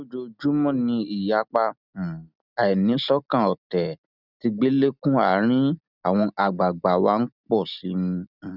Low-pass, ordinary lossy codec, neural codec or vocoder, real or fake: 5.4 kHz; none; none; real